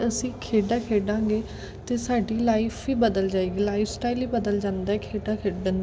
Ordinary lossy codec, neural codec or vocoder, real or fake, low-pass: none; none; real; none